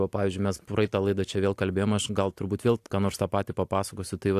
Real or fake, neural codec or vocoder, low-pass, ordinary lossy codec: real; none; 14.4 kHz; AAC, 64 kbps